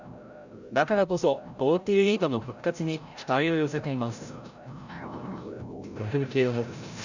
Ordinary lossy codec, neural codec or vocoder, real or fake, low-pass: none; codec, 16 kHz, 0.5 kbps, FreqCodec, larger model; fake; 7.2 kHz